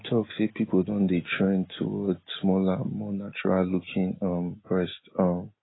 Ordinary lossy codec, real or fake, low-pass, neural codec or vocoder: AAC, 16 kbps; real; 7.2 kHz; none